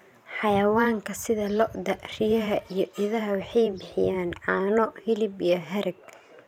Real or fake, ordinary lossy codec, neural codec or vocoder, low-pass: fake; none; vocoder, 44.1 kHz, 128 mel bands every 512 samples, BigVGAN v2; 19.8 kHz